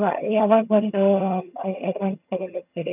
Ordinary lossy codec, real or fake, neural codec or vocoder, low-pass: none; fake; vocoder, 22.05 kHz, 80 mel bands, HiFi-GAN; 3.6 kHz